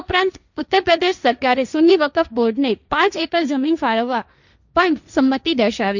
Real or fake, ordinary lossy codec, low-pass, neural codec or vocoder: fake; none; 7.2 kHz; codec, 16 kHz, 1.1 kbps, Voila-Tokenizer